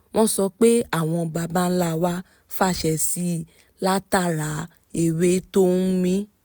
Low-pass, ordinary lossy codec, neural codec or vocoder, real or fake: none; none; none; real